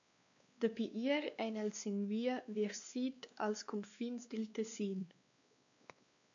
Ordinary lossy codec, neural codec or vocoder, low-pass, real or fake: MP3, 64 kbps; codec, 16 kHz, 2 kbps, X-Codec, WavLM features, trained on Multilingual LibriSpeech; 7.2 kHz; fake